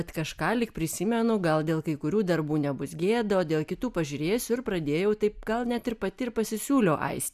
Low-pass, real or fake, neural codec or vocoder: 14.4 kHz; real; none